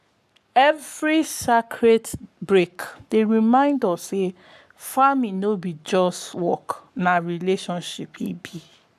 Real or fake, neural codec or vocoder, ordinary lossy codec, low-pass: fake; codec, 44.1 kHz, 7.8 kbps, Pupu-Codec; none; 14.4 kHz